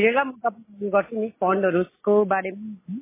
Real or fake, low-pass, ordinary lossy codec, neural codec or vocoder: real; 3.6 kHz; MP3, 16 kbps; none